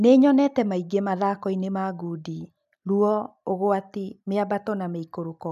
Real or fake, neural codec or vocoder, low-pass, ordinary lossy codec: real; none; 14.4 kHz; none